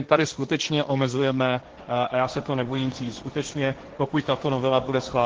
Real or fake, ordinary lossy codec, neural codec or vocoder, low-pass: fake; Opus, 16 kbps; codec, 16 kHz, 1.1 kbps, Voila-Tokenizer; 7.2 kHz